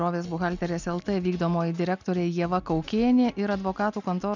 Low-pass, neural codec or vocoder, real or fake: 7.2 kHz; none; real